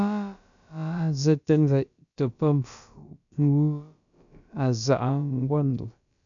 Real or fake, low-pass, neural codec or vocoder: fake; 7.2 kHz; codec, 16 kHz, about 1 kbps, DyCAST, with the encoder's durations